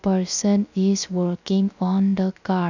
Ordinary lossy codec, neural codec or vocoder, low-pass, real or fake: none; codec, 16 kHz, 0.3 kbps, FocalCodec; 7.2 kHz; fake